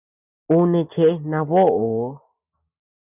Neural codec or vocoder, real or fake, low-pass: none; real; 3.6 kHz